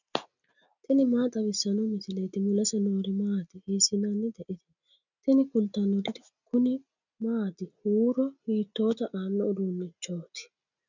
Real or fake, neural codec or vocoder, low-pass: real; none; 7.2 kHz